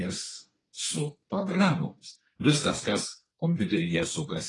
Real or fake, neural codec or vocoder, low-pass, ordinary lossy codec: fake; codec, 24 kHz, 1 kbps, SNAC; 10.8 kHz; AAC, 32 kbps